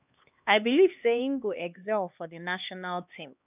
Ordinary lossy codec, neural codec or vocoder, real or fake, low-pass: none; codec, 16 kHz, 2 kbps, X-Codec, HuBERT features, trained on LibriSpeech; fake; 3.6 kHz